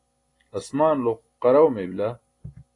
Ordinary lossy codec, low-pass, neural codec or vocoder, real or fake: AAC, 32 kbps; 10.8 kHz; none; real